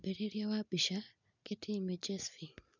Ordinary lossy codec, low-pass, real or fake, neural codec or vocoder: none; 7.2 kHz; real; none